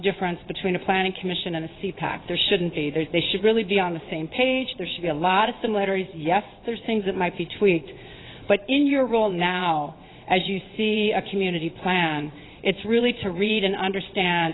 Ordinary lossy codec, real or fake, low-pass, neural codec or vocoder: AAC, 16 kbps; fake; 7.2 kHz; vocoder, 22.05 kHz, 80 mel bands, WaveNeXt